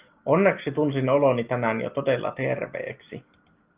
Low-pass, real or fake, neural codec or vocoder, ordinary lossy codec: 3.6 kHz; real; none; Opus, 32 kbps